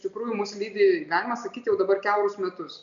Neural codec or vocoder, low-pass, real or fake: none; 7.2 kHz; real